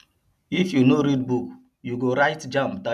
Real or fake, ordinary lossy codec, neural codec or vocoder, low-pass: real; none; none; 14.4 kHz